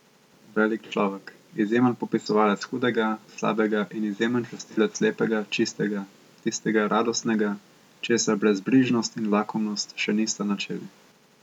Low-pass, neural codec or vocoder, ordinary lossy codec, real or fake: 19.8 kHz; none; MP3, 96 kbps; real